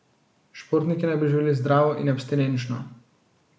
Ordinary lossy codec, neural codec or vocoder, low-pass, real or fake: none; none; none; real